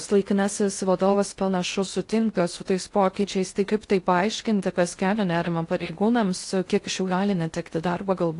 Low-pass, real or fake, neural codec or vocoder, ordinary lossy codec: 10.8 kHz; fake; codec, 16 kHz in and 24 kHz out, 0.6 kbps, FocalCodec, streaming, 2048 codes; AAC, 48 kbps